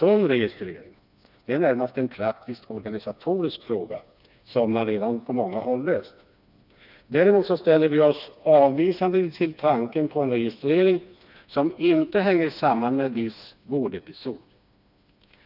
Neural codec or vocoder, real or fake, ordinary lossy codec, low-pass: codec, 16 kHz, 2 kbps, FreqCodec, smaller model; fake; none; 5.4 kHz